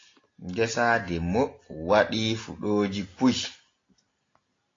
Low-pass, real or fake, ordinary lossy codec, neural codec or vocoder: 7.2 kHz; real; AAC, 32 kbps; none